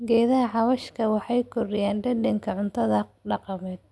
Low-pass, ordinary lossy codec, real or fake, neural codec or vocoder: none; none; real; none